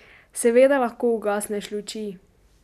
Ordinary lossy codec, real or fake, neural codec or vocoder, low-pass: none; real; none; 14.4 kHz